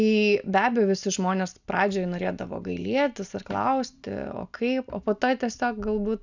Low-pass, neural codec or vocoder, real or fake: 7.2 kHz; none; real